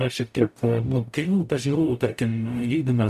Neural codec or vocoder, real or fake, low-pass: codec, 44.1 kHz, 0.9 kbps, DAC; fake; 14.4 kHz